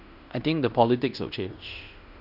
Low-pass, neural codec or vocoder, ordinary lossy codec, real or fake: 5.4 kHz; codec, 16 kHz in and 24 kHz out, 0.9 kbps, LongCat-Audio-Codec, fine tuned four codebook decoder; none; fake